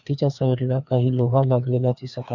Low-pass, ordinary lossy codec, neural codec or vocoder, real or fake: 7.2 kHz; none; codec, 16 kHz, 2 kbps, FreqCodec, larger model; fake